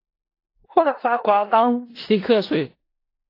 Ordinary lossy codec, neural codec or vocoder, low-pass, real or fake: AAC, 32 kbps; codec, 16 kHz in and 24 kHz out, 0.4 kbps, LongCat-Audio-Codec, four codebook decoder; 5.4 kHz; fake